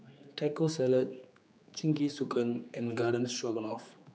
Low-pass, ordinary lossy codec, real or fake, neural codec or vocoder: none; none; fake; codec, 16 kHz, 4 kbps, X-Codec, HuBERT features, trained on general audio